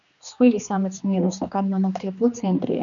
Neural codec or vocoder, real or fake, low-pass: codec, 16 kHz, 2 kbps, X-Codec, HuBERT features, trained on general audio; fake; 7.2 kHz